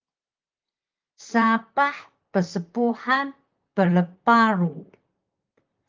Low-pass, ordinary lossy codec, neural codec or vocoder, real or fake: 7.2 kHz; Opus, 32 kbps; vocoder, 44.1 kHz, 128 mel bands, Pupu-Vocoder; fake